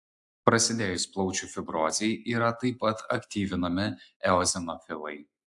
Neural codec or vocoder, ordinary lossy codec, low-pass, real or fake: none; AAC, 64 kbps; 10.8 kHz; real